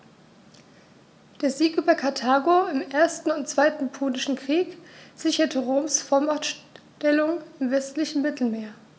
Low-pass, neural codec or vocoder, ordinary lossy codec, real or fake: none; none; none; real